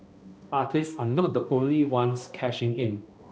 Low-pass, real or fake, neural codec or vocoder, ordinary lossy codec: none; fake; codec, 16 kHz, 1 kbps, X-Codec, HuBERT features, trained on balanced general audio; none